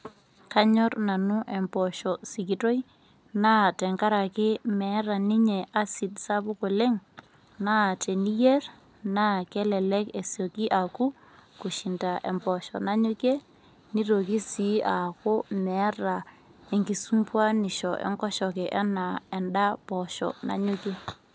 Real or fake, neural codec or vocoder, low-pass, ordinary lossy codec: real; none; none; none